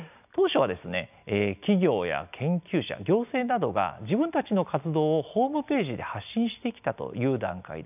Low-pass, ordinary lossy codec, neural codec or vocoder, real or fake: 3.6 kHz; none; none; real